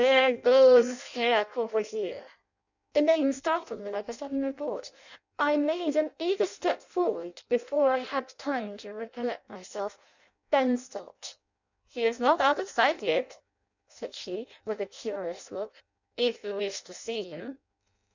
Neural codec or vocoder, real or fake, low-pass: codec, 16 kHz in and 24 kHz out, 0.6 kbps, FireRedTTS-2 codec; fake; 7.2 kHz